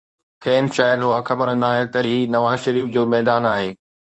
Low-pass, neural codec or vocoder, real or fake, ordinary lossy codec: 10.8 kHz; codec, 24 kHz, 0.9 kbps, WavTokenizer, medium speech release version 2; fake; Opus, 64 kbps